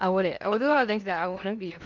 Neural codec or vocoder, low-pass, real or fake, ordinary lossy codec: codec, 16 kHz in and 24 kHz out, 0.8 kbps, FocalCodec, streaming, 65536 codes; 7.2 kHz; fake; none